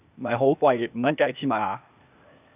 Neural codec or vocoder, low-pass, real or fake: codec, 16 kHz, 0.8 kbps, ZipCodec; 3.6 kHz; fake